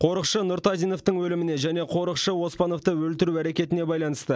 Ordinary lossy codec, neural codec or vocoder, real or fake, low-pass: none; none; real; none